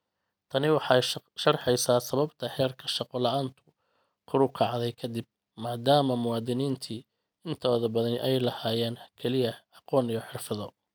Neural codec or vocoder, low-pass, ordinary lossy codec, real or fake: none; none; none; real